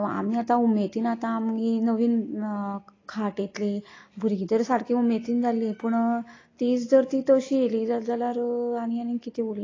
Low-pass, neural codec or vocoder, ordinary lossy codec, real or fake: 7.2 kHz; none; AAC, 32 kbps; real